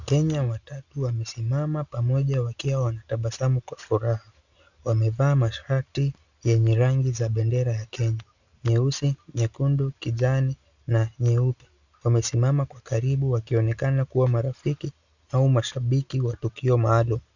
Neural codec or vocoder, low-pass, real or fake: none; 7.2 kHz; real